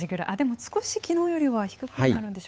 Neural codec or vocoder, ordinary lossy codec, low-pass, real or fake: codec, 16 kHz, 8 kbps, FunCodec, trained on Chinese and English, 25 frames a second; none; none; fake